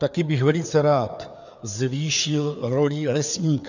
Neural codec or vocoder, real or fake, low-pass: codec, 16 kHz, 4 kbps, FreqCodec, larger model; fake; 7.2 kHz